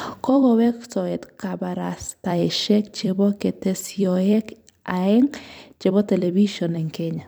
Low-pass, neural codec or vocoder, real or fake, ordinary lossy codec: none; vocoder, 44.1 kHz, 128 mel bands every 256 samples, BigVGAN v2; fake; none